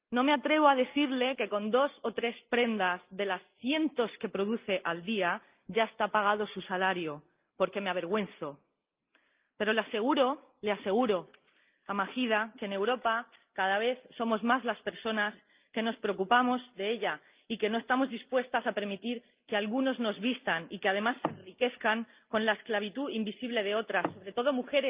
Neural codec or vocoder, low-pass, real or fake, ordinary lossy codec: none; 3.6 kHz; real; Opus, 24 kbps